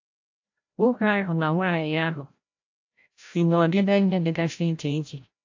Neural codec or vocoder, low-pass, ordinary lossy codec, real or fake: codec, 16 kHz, 0.5 kbps, FreqCodec, larger model; 7.2 kHz; AAC, 48 kbps; fake